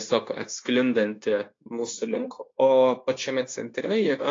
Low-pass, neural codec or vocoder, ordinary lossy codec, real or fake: 7.2 kHz; codec, 16 kHz, 0.9 kbps, LongCat-Audio-Codec; AAC, 32 kbps; fake